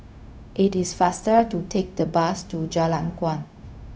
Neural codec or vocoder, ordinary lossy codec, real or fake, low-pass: codec, 16 kHz, 0.4 kbps, LongCat-Audio-Codec; none; fake; none